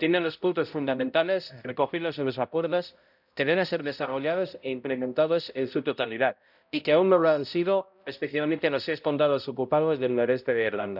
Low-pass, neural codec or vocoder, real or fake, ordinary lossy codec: 5.4 kHz; codec, 16 kHz, 0.5 kbps, X-Codec, HuBERT features, trained on balanced general audio; fake; none